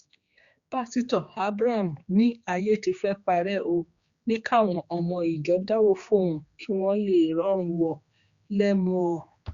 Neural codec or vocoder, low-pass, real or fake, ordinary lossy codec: codec, 16 kHz, 2 kbps, X-Codec, HuBERT features, trained on general audio; 7.2 kHz; fake; Opus, 64 kbps